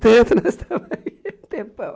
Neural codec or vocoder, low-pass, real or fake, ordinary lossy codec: none; none; real; none